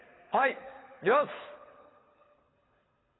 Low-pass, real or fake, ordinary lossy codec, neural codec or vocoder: 7.2 kHz; fake; AAC, 16 kbps; codec, 24 kHz, 6 kbps, HILCodec